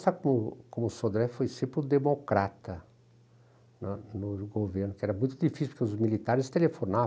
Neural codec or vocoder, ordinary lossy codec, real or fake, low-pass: none; none; real; none